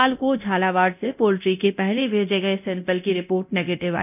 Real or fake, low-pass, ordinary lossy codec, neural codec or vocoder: fake; 3.6 kHz; none; codec, 24 kHz, 0.9 kbps, DualCodec